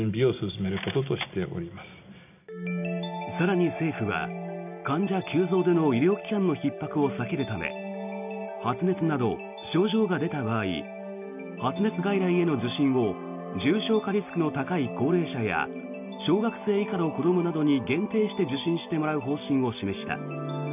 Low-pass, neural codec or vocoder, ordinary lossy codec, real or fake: 3.6 kHz; none; none; real